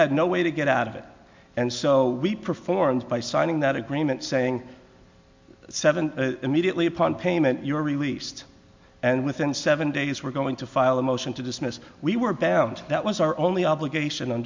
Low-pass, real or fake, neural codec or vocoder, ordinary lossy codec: 7.2 kHz; real; none; MP3, 64 kbps